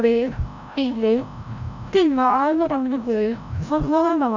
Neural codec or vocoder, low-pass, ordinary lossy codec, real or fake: codec, 16 kHz, 0.5 kbps, FreqCodec, larger model; 7.2 kHz; none; fake